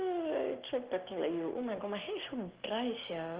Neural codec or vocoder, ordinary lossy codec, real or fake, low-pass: none; Opus, 16 kbps; real; 3.6 kHz